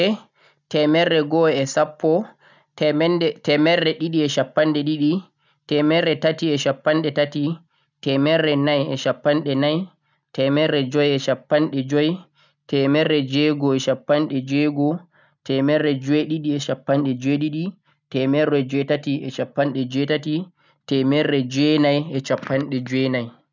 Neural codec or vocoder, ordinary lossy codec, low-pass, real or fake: none; none; 7.2 kHz; real